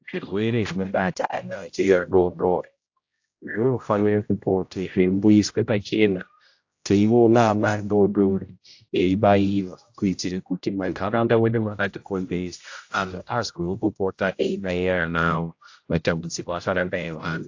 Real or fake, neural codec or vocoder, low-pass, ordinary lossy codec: fake; codec, 16 kHz, 0.5 kbps, X-Codec, HuBERT features, trained on general audio; 7.2 kHz; AAC, 48 kbps